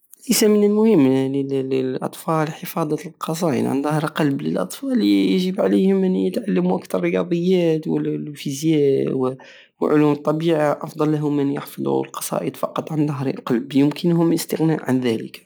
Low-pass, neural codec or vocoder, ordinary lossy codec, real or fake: none; none; none; real